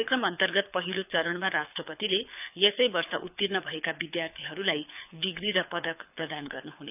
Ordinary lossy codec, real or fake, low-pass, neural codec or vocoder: none; fake; 3.6 kHz; codec, 24 kHz, 6 kbps, HILCodec